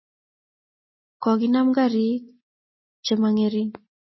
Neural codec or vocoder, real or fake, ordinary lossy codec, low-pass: none; real; MP3, 24 kbps; 7.2 kHz